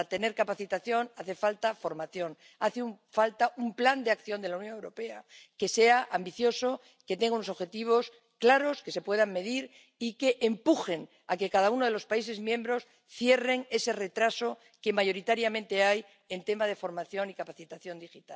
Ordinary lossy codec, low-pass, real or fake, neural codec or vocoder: none; none; real; none